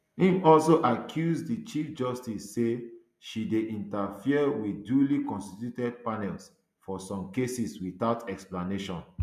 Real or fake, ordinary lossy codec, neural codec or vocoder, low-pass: real; MP3, 96 kbps; none; 14.4 kHz